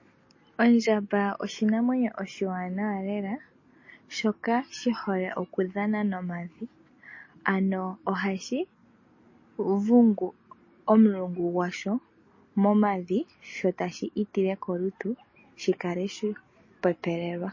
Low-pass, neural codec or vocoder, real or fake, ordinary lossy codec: 7.2 kHz; none; real; MP3, 32 kbps